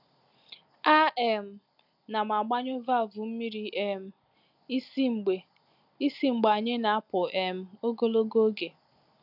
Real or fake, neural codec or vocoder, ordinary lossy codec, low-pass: real; none; none; 5.4 kHz